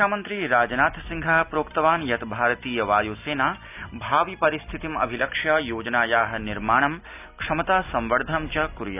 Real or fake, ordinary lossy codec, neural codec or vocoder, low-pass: real; none; none; 3.6 kHz